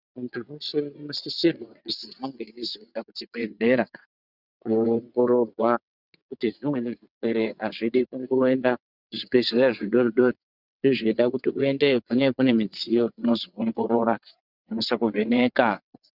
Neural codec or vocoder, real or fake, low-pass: vocoder, 22.05 kHz, 80 mel bands, WaveNeXt; fake; 5.4 kHz